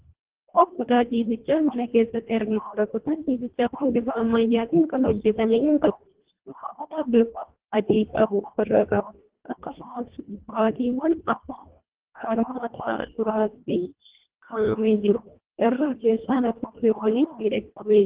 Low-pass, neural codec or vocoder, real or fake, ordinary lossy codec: 3.6 kHz; codec, 24 kHz, 1.5 kbps, HILCodec; fake; Opus, 16 kbps